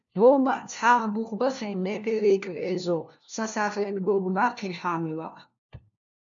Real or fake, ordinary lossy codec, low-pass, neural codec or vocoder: fake; MP3, 64 kbps; 7.2 kHz; codec, 16 kHz, 1 kbps, FunCodec, trained on LibriTTS, 50 frames a second